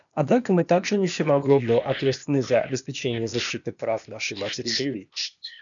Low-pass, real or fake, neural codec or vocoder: 7.2 kHz; fake; codec, 16 kHz, 0.8 kbps, ZipCodec